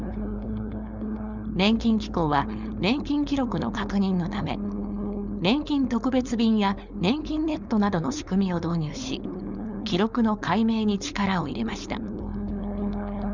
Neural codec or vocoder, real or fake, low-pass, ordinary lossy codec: codec, 16 kHz, 4.8 kbps, FACodec; fake; 7.2 kHz; Opus, 64 kbps